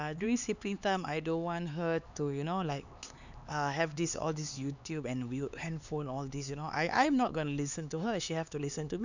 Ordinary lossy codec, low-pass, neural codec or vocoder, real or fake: none; 7.2 kHz; codec, 16 kHz, 4 kbps, X-Codec, HuBERT features, trained on LibriSpeech; fake